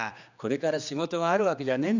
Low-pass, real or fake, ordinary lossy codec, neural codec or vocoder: 7.2 kHz; fake; none; codec, 16 kHz, 2 kbps, X-Codec, HuBERT features, trained on general audio